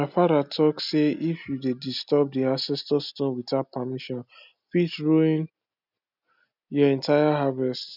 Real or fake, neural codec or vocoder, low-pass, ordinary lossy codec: real; none; 5.4 kHz; none